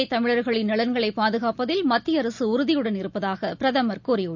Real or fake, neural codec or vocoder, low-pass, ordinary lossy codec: real; none; 7.2 kHz; none